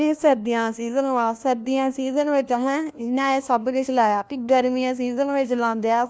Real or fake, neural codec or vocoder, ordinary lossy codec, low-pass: fake; codec, 16 kHz, 1 kbps, FunCodec, trained on LibriTTS, 50 frames a second; none; none